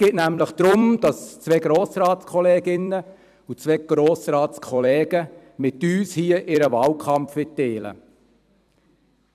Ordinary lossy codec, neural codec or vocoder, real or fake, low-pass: none; vocoder, 44.1 kHz, 128 mel bands every 256 samples, BigVGAN v2; fake; 14.4 kHz